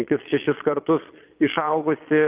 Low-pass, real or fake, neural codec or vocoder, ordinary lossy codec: 3.6 kHz; fake; vocoder, 22.05 kHz, 80 mel bands, Vocos; Opus, 24 kbps